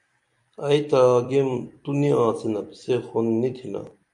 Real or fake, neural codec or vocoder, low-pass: fake; vocoder, 44.1 kHz, 128 mel bands every 256 samples, BigVGAN v2; 10.8 kHz